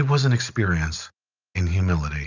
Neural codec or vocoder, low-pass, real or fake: none; 7.2 kHz; real